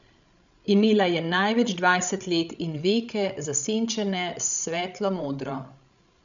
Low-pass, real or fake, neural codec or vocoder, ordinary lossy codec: 7.2 kHz; fake; codec, 16 kHz, 16 kbps, FreqCodec, larger model; none